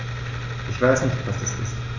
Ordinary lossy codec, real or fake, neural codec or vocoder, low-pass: MP3, 64 kbps; real; none; 7.2 kHz